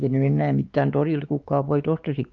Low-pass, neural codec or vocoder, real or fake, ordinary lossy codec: 7.2 kHz; codec, 16 kHz, 4 kbps, X-Codec, WavLM features, trained on Multilingual LibriSpeech; fake; Opus, 24 kbps